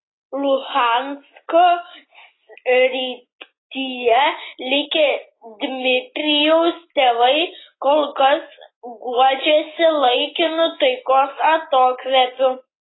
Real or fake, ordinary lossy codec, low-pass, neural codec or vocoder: real; AAC, 16 kbps; 7.2 kHz; none